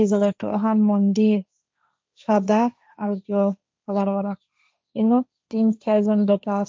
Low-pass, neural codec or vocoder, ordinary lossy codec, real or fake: none; codec, 16 kHz, 1.1 kbps, Voila-Tokenizer; none; fake